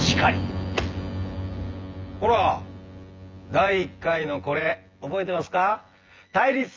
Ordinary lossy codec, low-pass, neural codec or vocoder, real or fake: Opus, 32 kbps; 7.2 kHz; vocoder, 24 kHz, 100 mel bands, Vocos; fake